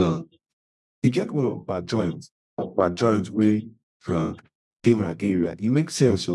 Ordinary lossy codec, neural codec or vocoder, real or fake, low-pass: none; codec, 24 kHz, 0.9 kbps, WavTokenizer, medium music audio release; fake; none